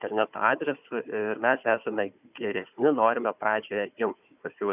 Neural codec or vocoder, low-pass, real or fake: codec, 16 kHz, 4 kbps, FunCodec, trained on Chinese and English, 50 frames a second; 3.6 kHz; fake